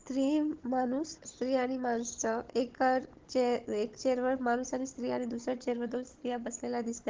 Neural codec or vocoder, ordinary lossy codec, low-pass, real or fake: codec, 16 kHz, 16 kbps, FunCodec, trained on Chinese and English, 50 frames a second; Opus, 16 kbps; 7.2 kHz; fake